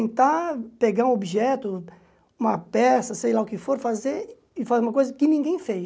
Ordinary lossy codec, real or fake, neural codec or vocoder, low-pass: none; real; none; none